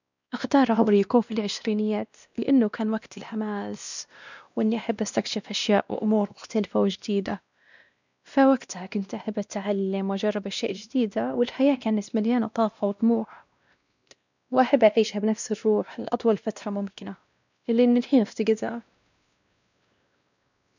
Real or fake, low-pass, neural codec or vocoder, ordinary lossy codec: fake; 7.2 kHz; codec, 16 kHz, 1 kbps, X-Codec, WavLM features, trained on Multilingual LibriSpeech; none